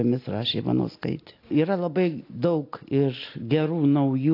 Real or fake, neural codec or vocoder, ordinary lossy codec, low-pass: real; none; AAC, 32 kbps; 5.4 kHz